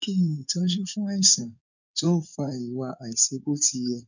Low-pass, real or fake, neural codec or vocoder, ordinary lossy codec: 7.2 kHz; fake; codec, 16 kHz in and 24 kHz out, 2.2 kbps, FireRedTTS-2 codec; none